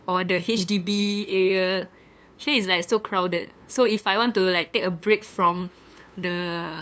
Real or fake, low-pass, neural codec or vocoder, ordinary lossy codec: fake; none; codec, 16 kHz, 2 kbps, FunCodec, trained on LibriTTS, 25 frames a second; none